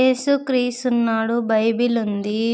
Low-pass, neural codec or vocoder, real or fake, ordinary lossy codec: none; none; real; none